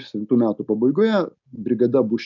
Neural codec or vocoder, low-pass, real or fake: none; 7.2 kHz; real